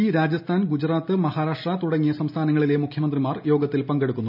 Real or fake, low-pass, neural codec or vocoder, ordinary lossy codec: real; 5.4 kHz; none; none